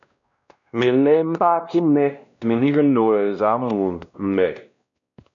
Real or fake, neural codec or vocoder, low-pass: fake; codec, 16 kHz, 1 kbps, X-Codec, WavLM features, trained on Multilingual LibriSpeech; 7.2 kHz